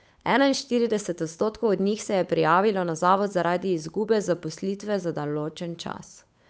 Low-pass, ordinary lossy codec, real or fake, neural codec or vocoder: none; none; fake; codec, 16 kHz, 8 kbps, FunCodec, trained on Chinese and English, 25 frames a second